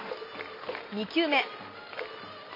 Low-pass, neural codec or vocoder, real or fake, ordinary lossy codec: 5.4 kHz; none; real; MP3, 24 kbps